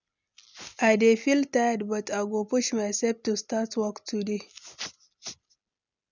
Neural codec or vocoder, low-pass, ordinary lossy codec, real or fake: none; 7.2 kHz; none; real